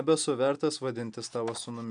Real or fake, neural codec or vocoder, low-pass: real; none; 9.9 kHz